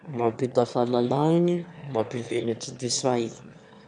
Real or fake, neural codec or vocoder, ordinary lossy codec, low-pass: fake; autoencoder, 22.05 kHz, a latent of 192 numbers a frame, VITS, trained on one speaker; none; 9.9 kHz